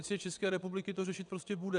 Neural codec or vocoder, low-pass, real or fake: vocoder, 22.05 kHz, 80 mel bands, WaveNeXt; 9.9 kHz; fake